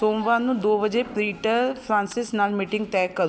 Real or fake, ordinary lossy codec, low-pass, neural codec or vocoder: real; none; none; none